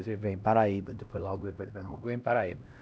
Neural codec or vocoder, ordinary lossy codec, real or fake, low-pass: codec, 16 kHz, 1 kbps, X-Codec, HuBERT features, trained on LibriSpeech; none; fake; none